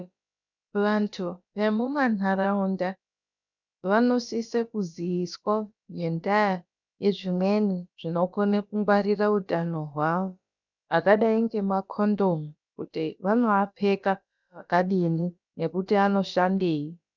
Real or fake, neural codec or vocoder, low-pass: fake; codec, 16 kHz, about 1 kbps, DyCAST, with the encoder's durations; 7.2 kHz